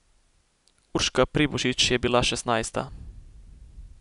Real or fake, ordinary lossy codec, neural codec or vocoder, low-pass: real; none; none; 10.8 kHz